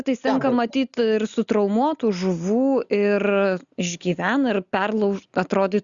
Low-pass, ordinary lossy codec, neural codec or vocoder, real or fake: 7.2 kHz; Opus, 64 kbps; none; real